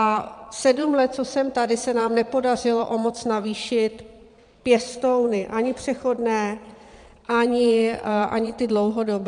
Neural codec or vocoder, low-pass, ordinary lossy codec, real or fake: vocoder, 22.05 kHz, 80 mel bands, Vocos; 9.9 kHz; MP3, 96 kbps; fake